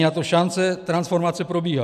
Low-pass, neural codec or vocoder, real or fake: 14.4 kHz; none; real